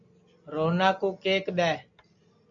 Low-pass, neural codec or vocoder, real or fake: 7.2 kHz; none; real